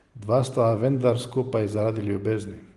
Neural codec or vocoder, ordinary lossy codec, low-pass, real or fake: none; Opus, 24 kbps; 10.8 kHz; real